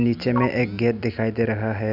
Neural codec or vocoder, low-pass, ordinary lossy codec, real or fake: none; 5.4 kHz; none; real